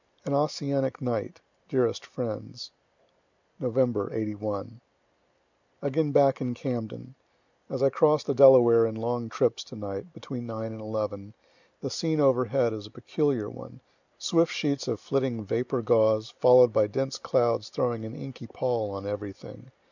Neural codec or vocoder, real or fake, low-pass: none; real; 7.2 kHz